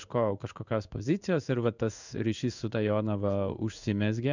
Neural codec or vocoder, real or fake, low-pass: codec, 16 kHz in and 24 kHz out, 1 kbps, XY-Tokenizer; fake; 7.2 kHz